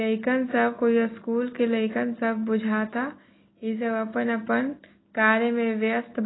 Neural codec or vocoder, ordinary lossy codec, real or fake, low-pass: none; AAC, 16 kbps; real; 7.2 kHz